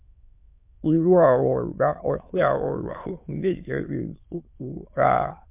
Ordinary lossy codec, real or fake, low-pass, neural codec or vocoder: MP3, 32 kbps; fake; 3.6 kHz; autoencoder, 22.05 kHz, a latent of 192 numbers a frame, VITS, trained on many speakers